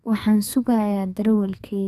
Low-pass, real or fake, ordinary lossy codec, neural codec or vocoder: 14.4 kHz; fake; none; codec, 44.1 kHz, 2.6 kbps, SNAC